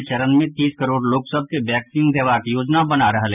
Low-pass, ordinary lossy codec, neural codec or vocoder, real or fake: 3.6 kHz; none; none; real